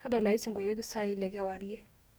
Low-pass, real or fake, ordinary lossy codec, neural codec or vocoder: none; fake; none; codec, 44.1 kHz, 2.6 kbps, DAC